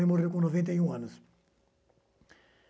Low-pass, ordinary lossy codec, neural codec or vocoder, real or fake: none; none; none; real